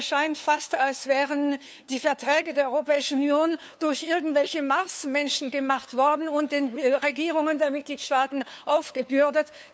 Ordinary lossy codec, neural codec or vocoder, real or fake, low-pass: none; codec, 16 kHz, 2 kbps, FunCodec, trained on LibriTTS, 25 frames a second; fake; none